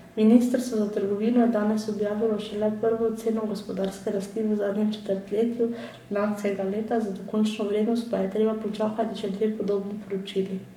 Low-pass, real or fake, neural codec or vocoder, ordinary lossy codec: 19.8 kHz; fake; codec, 44.1 kHz, 7.8 kbps, Pupu-Codec; none